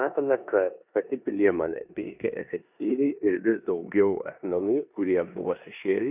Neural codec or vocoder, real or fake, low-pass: codec, 16 kHz in and 24 kHz out, 0.9 kbps, LongCat-Audio-Codec, four codebook decoder; fake; 3.6 kHz